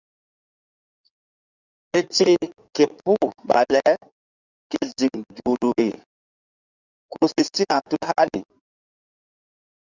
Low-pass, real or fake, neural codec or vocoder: 7.2 kHz; fake; codec, 16 kHz in and 24 kHz out, 2.2 kbps, FireRedTTS-2 codec